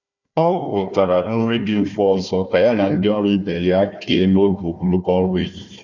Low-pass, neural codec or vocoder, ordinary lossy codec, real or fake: 7.2 kHz; codec, 16 kHz, 1 kbps, FunCodec, trained on Chinese and English, 50 frames a second; none; fake